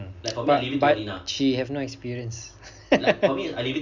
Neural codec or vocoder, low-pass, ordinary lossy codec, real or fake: none; 7.2 kHz; none; real